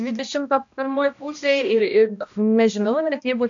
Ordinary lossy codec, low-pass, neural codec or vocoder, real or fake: AAC, 64 kbps; 7.2 kHz; codec, 16 kHz, 1 kbps, X-Codec, HuBERT features, trained on balanced general audio; fake